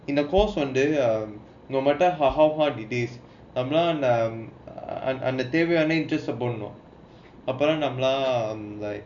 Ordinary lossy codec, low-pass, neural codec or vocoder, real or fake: none; 7.2 kHz; none; real